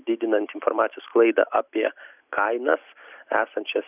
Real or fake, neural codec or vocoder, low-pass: real; none; 3.6 kHz